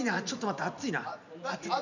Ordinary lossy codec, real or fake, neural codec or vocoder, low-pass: none; fake; vocoder, 44.1 kHz, 128 mel bands every 256 samples, BigVGAN v2; 7.2 kHz